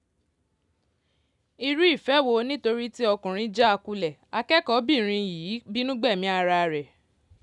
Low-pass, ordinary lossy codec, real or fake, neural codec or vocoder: 10.8 kHz; none; real; none